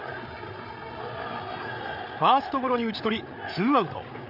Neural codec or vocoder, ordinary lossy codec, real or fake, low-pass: codec, 16 kHz, 8 kbps, FreqCodec, larger model; none; fake; 5.4 kHz